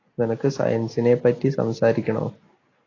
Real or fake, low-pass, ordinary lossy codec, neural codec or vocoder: real; 7.2 kHz; AAC, 32 kbps; none